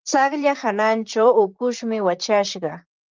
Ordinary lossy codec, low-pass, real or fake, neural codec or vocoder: Opus, 32 kbps; 7.2 kHz; fake; vocoder, 44.1 kHz, 128 mel bands, Pupu-Vocoder